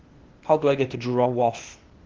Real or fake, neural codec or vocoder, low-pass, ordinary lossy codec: fake; codec, 16 kHz, 0.8 kbps, ZipCodec; 7.2 kHz; Opus, 16 kbps